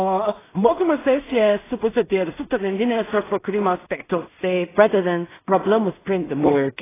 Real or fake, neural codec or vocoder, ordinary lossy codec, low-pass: fake; codec, 16 kHz in and 24 kHz out, 0.4 kbps, LongCat-Audio-Codec, two codebook decoder; AAC, 16 kbps; 3.6 kHz